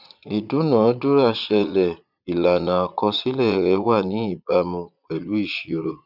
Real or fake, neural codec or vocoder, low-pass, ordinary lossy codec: fake; vocoder, 22.05 kHz, 80 mel bands, Vocos; 5.4 kHz; none